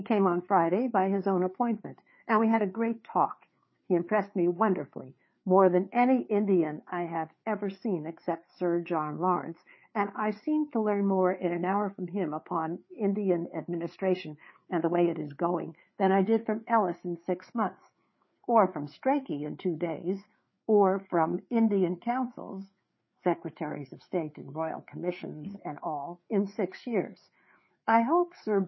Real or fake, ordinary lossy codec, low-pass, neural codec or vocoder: fake; MP3, 24 kbps; 7.2 kHz; codec, 16 kHz, 4 kbps, FreqCodec, larger model